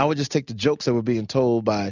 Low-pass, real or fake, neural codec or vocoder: 7.2 kHz; real; none